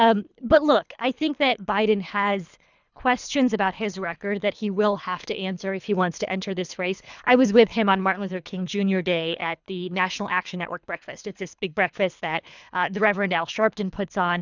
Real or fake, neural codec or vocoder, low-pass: fake; codec, 24 kHz, 3 kbps, HILCodec; 7.2 kHz